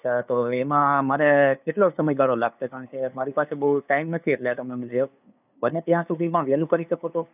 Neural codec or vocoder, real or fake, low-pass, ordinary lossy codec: codec, 16 kHz, 2 kbps, FunCodec, trained on LibriTTS, 25 frames a second; fake; 3.6 kHz; none